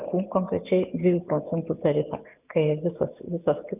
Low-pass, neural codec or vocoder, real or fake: 3.6 kHz; none; real